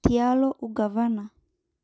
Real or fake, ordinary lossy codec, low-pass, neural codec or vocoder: real; none; none; none